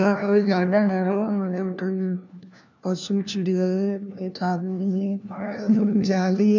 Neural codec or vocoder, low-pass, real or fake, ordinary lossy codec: codec, 16 kHz, 1 kbps, FunCodec, trained on LibriTTS, 50 frames a second; 7.2 kHz; fake; none